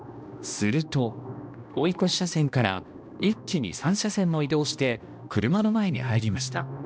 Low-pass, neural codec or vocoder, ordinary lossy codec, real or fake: none; codec, 16 kHz, 1 kbps, X-Codec, HuBERT features, trained on balanced general audio; none; fake